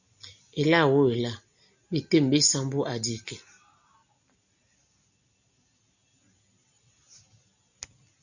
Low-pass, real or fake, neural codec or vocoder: 7.2 kHz; real; none